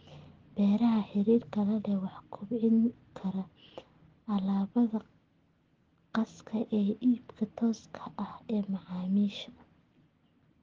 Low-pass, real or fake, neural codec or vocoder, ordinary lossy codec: 7.2 kHz; real; none; Opus, 16 kbps